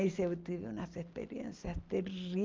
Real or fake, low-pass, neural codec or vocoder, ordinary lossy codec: real; 7.2 kHz; none; Opus, 32 kbps